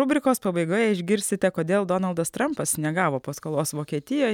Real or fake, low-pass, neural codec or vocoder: real; 19.8 kHz; none